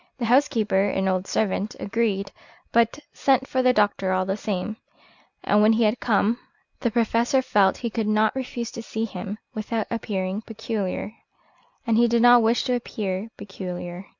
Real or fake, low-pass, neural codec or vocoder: real; 7.2 kHz; none